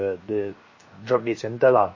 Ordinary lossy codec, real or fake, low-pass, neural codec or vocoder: MP3, 32 kbps; fake; 7.2 kHz; codec, 16 kHz, 0.7 kbps, FocalCodec